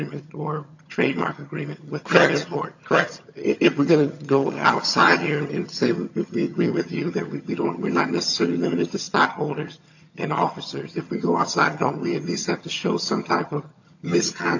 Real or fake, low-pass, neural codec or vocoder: fake; 7.2 kHz; vocoder, 22.05 kHz, 80 mel bands, HiFi-GAN